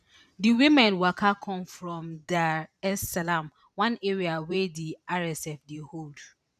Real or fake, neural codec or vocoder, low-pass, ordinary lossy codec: fake; vocoder, 44.1 kHz, 128 mel bands every 512 samples, BigVGAN v2; 14.4 kHz; none